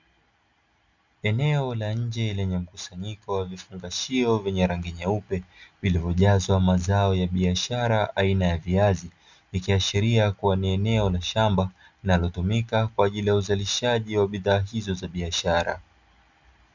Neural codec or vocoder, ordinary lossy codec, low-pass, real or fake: none; Opus, 64 kbps; 7.2 kHz; real